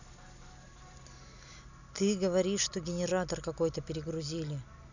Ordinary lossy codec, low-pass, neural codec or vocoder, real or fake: none; 7.2 kHz; none; real